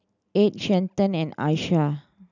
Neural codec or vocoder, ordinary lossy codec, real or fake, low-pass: none; none; real; 7.2 kHz